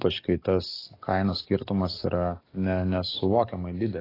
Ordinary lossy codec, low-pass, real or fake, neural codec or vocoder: AAC, 24 kbps; 5.4 kHz; fake; codec, 44.1 kHz, 7.8 kbps, DAC